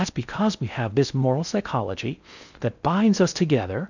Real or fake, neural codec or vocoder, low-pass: fake; codec, 16 kHz in and 24 kHz out, 0.6 kbps, FocalCodec, streaming, 4096 codes; 7.2 kHz